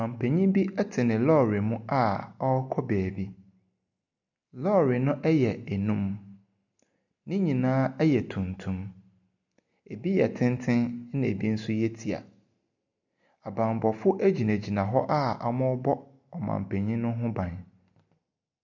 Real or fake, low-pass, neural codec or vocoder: real; 7.2 kHz; none